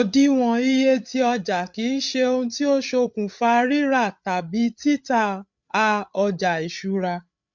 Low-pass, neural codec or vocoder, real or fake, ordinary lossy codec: 7.2 kHz; codec, 16 kHz, 8 kbps, FreqCodec, larger model; fake; MP3, 64 kbps